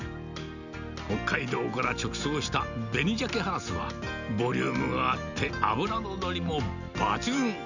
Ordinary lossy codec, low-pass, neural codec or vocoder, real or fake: none; 7.2 kHz; none; real